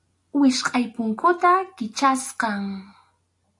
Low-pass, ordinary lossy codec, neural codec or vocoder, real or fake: 10.8 kHz; AAC, 64 kbps; none; real